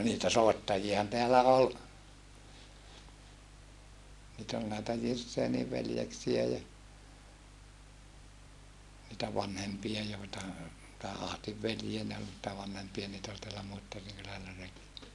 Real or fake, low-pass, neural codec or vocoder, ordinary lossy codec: real; none; none; none